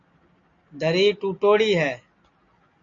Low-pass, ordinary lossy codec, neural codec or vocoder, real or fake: 7.2 kHz; AAC, 48 kbps; none; real